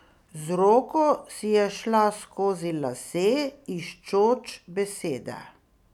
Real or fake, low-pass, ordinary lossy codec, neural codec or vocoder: real; 19.8 kHz; none; none